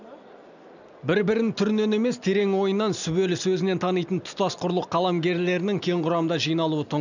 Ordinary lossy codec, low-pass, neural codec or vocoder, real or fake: none; 7.2 kHz; none; real